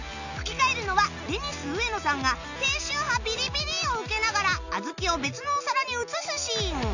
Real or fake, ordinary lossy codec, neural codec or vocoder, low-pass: real; none; none; 7.2 kHz